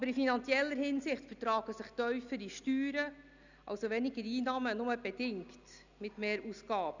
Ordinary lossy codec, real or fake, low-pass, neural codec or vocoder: none; real; 7.2 kHz; none